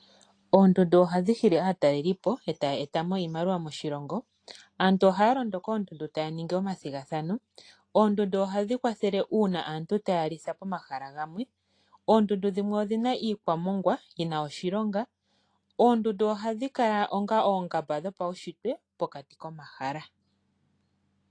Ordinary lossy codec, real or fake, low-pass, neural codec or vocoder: AAC, 48 kbps; real; 9.9 kHz; none